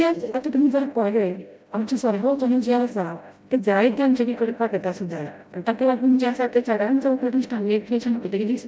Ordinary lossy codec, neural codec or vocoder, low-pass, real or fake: none; codec, 16 kHz, 0.5 kbps, FreqCodec, smaller model; none; fake